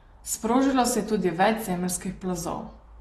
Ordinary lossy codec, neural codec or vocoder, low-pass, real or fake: AAC, 32 kbps; none; 19.8 kHz; real